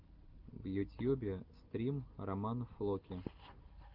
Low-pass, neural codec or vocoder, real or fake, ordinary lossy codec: 5.4 kHz; none; real; Opus, 24 kbps